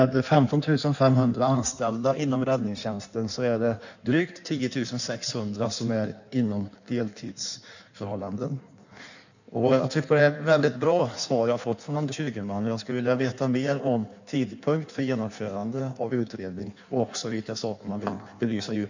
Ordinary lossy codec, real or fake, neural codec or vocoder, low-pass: none; fake; codec, 16 kHz in and 24 kHz out, 1.1 kbps, FireRedTTS-2 codec; 7.2 kHz